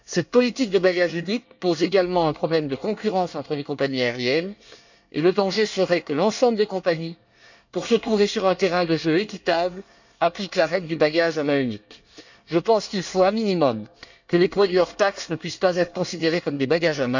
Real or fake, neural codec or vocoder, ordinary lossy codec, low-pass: fake; codec, 24 kHz, 1 kbps, SNAC; none; 7.2 kHz